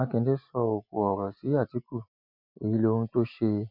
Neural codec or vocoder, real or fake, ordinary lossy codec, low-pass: none; real; none; 5.4 kHz